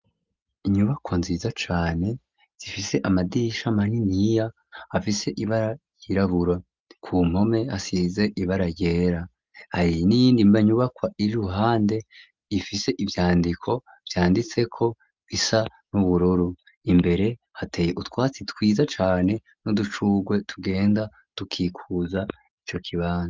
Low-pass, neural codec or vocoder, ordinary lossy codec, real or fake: 7.2 kHz; none; Opus, 24 kbps; real